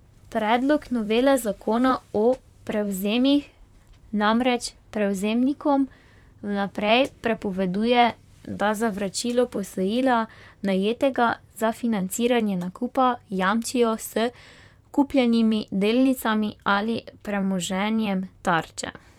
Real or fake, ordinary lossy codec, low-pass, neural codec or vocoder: fake; none; 19.8 kHz; vocoder, 44.1 kHz, 128 mel bands, Pupu-Vocoder